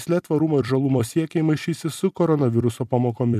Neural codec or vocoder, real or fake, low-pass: vocoder, 44.1 kHz, 128 mel bands every 256 samples, BigVGAN v2; fake; 14.4 kHz